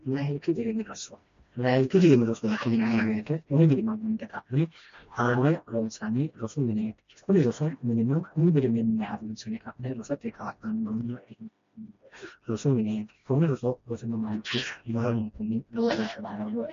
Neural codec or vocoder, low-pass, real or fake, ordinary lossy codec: codec, 16 kHz, 1 kbps, FreqCodec, smaller model; 7.2 kHz; fake; MP3, 48 kbps